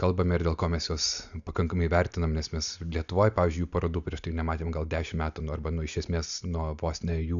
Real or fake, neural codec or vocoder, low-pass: real; none; 7.2 kHz